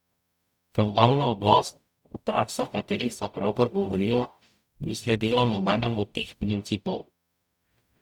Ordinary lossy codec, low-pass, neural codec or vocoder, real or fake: none; 19.8 kHz; codec, 44.1 kHz, 0.9 kbps, DAC; fake